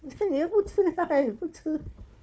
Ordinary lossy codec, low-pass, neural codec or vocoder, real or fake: none; none; codec, 16 kHz, 4 kbps, FunCodec, trained on Chinese and English, 50 frames a second; fake